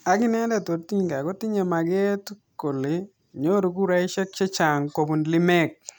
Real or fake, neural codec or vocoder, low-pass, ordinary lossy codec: real; none; none; none